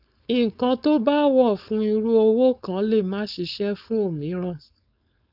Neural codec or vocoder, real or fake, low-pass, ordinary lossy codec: codec, 16 kHz, 4.8 kbps, FACodec; fake; 5.4 kHz; none